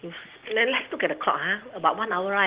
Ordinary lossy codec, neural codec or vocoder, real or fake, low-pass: Opus, 16 kbps; none; real; 3.6 kHz